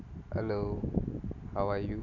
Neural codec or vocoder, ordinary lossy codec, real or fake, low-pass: none; none; real; 7.2 kHz